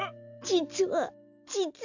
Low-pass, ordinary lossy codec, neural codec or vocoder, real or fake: 7.2 kHz; none; none; real